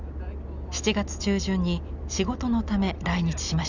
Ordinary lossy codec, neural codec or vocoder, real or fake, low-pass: none; none; real; 7.2 kHz